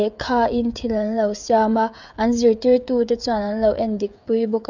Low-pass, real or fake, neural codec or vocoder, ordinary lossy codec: 7.2 kHz; fake; codec, 16 kHz, 16 kbps, FreqCodec, smaller model; none